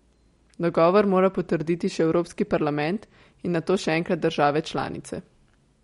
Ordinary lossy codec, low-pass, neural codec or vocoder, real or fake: MP3, 48 kbps; 19.8 kHz; none; real